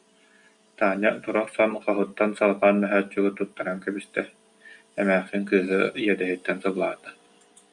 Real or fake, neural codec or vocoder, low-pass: real; none; 10.8 kHz